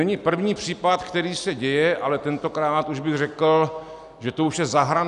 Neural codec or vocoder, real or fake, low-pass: none; real; 10.8 kHz